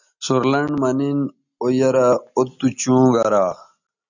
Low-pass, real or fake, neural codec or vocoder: 7.2 kHz; real; none